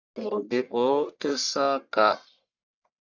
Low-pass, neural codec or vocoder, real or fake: 7.2 kHz; codec, 44.1 kHz, 1.7 kbps, Pupu-Codec; fake